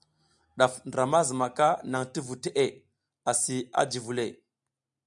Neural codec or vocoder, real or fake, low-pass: none; real; 10.8 kHz